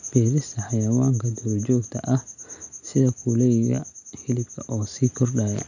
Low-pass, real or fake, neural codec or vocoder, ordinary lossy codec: 7.2 kHz; real; none; none